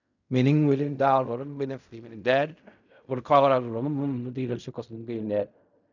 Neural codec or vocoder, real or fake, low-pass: codec, 16 kHz in and 24 kHz out, 0.4 kbps, LongCat-Audio-Codec, fine tuned four codebook decoder; fake; 7.2 kHz